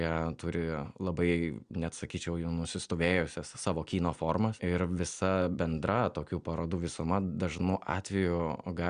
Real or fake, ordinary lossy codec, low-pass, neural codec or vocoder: real; Opus, 64 kbps; 9.9 kHz; none